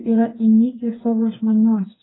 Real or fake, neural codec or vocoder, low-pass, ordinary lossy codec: fake; codec, 16 kHz, 4 kbps, FreqCodec, smaller model; 7.2 kHz; AAC, 16 kbps